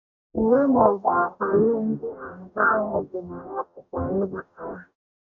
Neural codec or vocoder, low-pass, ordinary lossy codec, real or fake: codec, 44.1 kHz, 0.9 kbps, DAC; 7.2 kHz; none; fake